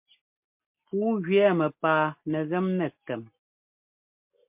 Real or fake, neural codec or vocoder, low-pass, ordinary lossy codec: real; none; 3.6 kHz; MP3, 32 kbps